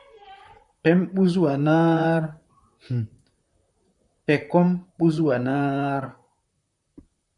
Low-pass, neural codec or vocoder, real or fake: 10.8 kHz; vocoder, 44.1 kHz, 128 mel bands, Pupu-Vocoder; fake